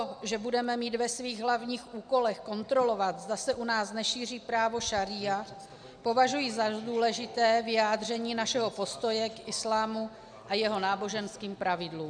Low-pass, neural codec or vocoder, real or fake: 9.9 kHz; none; real